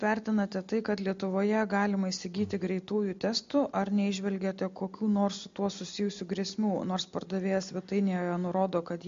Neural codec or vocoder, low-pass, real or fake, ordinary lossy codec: none; 7.2 kHz; real; MP3, 48 kbps